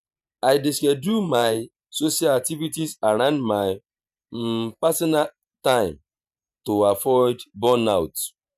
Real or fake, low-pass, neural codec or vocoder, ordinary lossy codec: fake; 14.4 kHz; vocoder, 44.1 kHz, 128 mel bands every 512 samples, BigVGAN v2; none